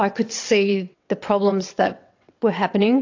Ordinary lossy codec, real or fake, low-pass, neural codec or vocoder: AAC, 48 kbps; fake; 7.2 kHz; vocoder, 22.05 kHz, 80 mel bands, WaveNeXt